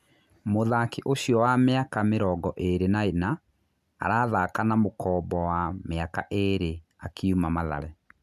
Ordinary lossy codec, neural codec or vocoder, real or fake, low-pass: AAC, 96 kbps; none; real; 14.4 kHz